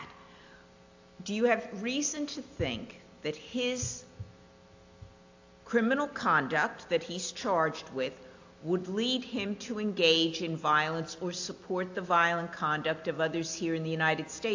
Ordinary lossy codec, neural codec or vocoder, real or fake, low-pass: AAC, 48 kbps; none; real; 7.2 kHz